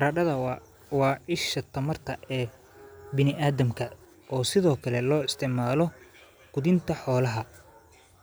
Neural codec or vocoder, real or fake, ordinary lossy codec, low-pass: none; real; none; none